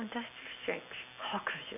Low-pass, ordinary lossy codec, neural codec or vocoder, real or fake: 3.6 kHz; none; none; real